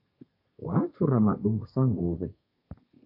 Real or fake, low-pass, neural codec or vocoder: fake; 5.4 kHz; codec, 32 kHz, 1.9 kbps, SNAC